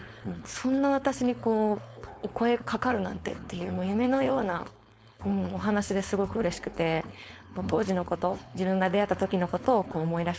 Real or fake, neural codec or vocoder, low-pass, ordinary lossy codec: fake; codec, 16 kHz, 4.8 kbps, FACodec; none; none